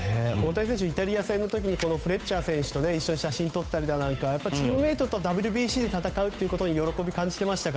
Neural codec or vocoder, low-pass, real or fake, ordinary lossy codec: codec, 16 kHz, 8 kbps, FunCodec, trained on Chinese and English, 25 frames a second; none; fake; none